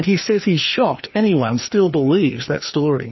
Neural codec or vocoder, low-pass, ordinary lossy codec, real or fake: codec, 16 kHz, 2 kbps, FreqCodec, larger model; 7.2 kHz; MP3, 24 kbps; fake